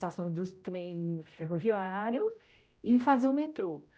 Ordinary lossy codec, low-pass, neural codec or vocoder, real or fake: none; none; codec, 16 kHz, 0.5 kbps, X-Codec, HuBERT features, trained on general audio; fake